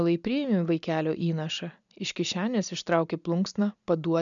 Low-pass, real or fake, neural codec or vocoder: 7.2 kHz; real; none